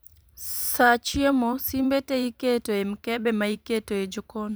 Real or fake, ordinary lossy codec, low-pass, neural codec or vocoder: fake; none; none; vocoder, 44.1 kHz, 128 mel bands every 512 samples, BigVGAN v2